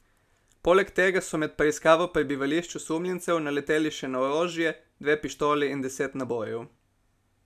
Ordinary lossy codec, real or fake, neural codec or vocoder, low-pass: none; real; none; 14.4 kHz